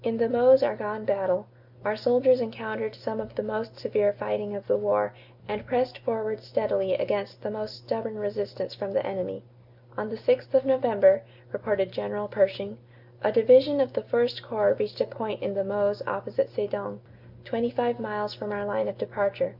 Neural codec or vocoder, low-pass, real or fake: none; 5.4 kHz; real